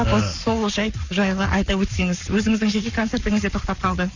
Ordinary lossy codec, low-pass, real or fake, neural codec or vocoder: none; 7.2 kHz; fake; codec, 44.1 kHz, 7.8 kbps, Pupu-Codec